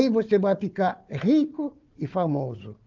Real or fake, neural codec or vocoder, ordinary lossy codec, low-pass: fake; codec, 16 kHz, 4 kbps, FunCodec, trained on Chinese and English, 50 frames a second; Opus, 24 kbps; 7.2 kHz